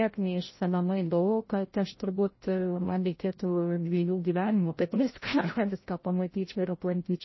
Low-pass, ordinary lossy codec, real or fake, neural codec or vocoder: 7.2 kHz; MP3, 24 kbps; fake; codec, 16 kHz, 0.5 kbps, FreqCodec, larger model